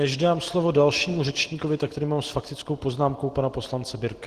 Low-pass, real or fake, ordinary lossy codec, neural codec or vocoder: 14.4 kHz; real; Opus, 16 kbps; none